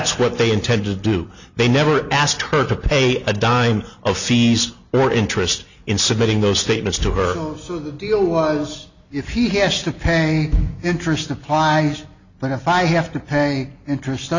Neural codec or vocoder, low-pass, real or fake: none; 7.2 kHz; real